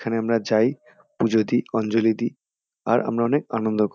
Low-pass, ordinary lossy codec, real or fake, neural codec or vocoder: none; none; real; none